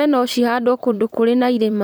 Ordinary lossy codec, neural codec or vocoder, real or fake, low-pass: none; vocoder, 44.1 kHz, 128 mel bands every 512 samples, BigVGAN v2; fake; none